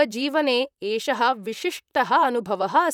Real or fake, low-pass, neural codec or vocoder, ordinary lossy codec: fake; 19.8 kHz; vocoder, 44.1 kHz, 128 mel bands, Pupu-Vocoder; none